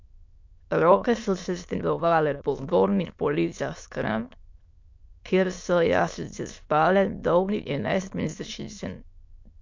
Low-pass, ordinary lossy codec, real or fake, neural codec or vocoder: 7.2 kHz; MP3, 48 kbps; fake; autoencoder, 22.05 kHz, a latent of 192 numbers a frame, VITS, trained on many speakers